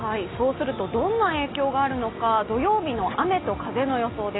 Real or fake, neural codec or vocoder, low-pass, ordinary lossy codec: real; none; 7.2 kHz; AAC, 16 kbps